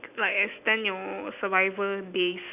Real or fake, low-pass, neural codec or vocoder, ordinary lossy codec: real; 3.6 kHz; none; none